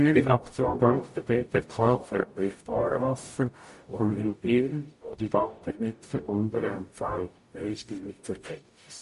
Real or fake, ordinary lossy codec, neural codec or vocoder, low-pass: fake; MP3, 48 kbps; codec, 44.1 kHz, 0.9 kbps, DAC; 14.4 kHz